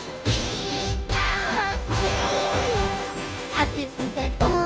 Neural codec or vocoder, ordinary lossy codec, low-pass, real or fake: codec, 16 kHz, 0.5 kbps, FunCodec, trained on Chinese and English, 25 frames a second; none; none; fake